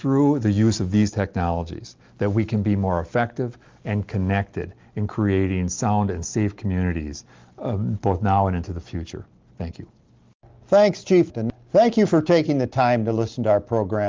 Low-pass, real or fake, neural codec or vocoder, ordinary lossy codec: 7.2 kHz; real; none; Opus, 32 kbps